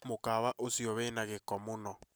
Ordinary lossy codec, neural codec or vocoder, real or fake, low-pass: none; none; real; none